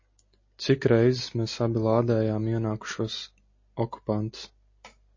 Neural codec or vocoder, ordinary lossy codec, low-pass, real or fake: none; MP3, 32 kbps; 7.2 kHz; real